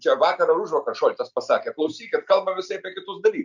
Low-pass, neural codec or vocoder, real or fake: 7.2 kHz; none; real